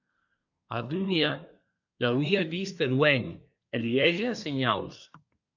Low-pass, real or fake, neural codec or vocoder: 7.2 kHz; fake; codec, 24 kHz, 1 kbps, SNAC